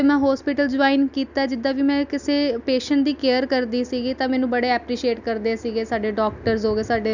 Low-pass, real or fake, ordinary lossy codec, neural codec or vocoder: 7.2 kHz; real; none; none